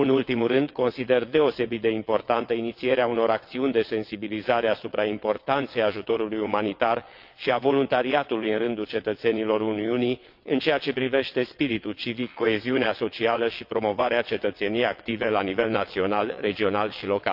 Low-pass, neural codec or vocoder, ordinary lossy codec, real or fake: 5.4 kHz; vocoder, 22.05 kHz, 80 mel bands, WaveNeXt; MP3, 48 kbps; fake